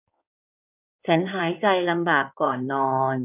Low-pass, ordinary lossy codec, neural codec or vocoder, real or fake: 3.6 kHz; none; codec, 16 kHz in and 24 kHz out, 2.2 kbps, FireRedTTS-2 codec; fake